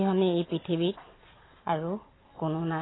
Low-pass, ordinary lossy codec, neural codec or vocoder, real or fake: 7.2 kHz; AAC, 16 kbps; none; real